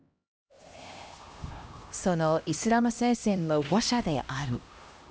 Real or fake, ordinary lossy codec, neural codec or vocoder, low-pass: fake; none; codec, 16 kHz, 1 kbps, X-Codec, HuBERT features, trained on LibriSpeech; none